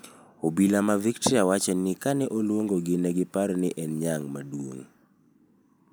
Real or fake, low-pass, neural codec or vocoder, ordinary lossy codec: real; none; none; none